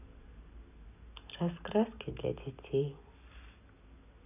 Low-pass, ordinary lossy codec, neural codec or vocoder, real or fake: 3.6 kHz; none; none; real